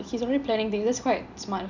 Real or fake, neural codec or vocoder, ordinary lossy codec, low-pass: real; none; none; 7.2 kHz